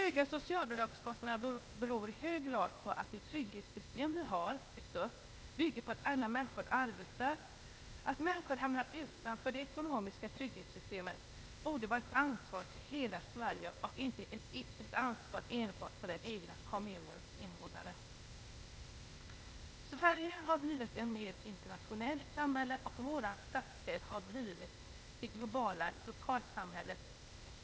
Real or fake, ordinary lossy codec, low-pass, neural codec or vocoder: fake; none; none; codec, 16 kHz, 0.8 kbps, ZipCodec